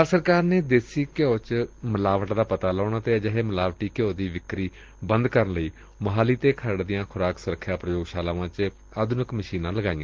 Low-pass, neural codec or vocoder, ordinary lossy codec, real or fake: 7.2 kHz; none; Opus, 16 kbps; real